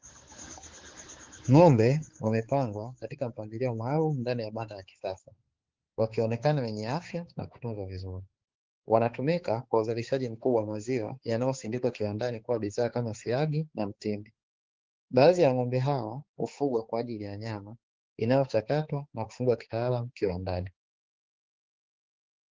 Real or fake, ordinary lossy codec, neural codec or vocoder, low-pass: fake; Opus, 16 kbps; codec, 16 kHz, 4 kbps, X-Codec, HuBERT features, trained on balanced general audio; 7.2 kHz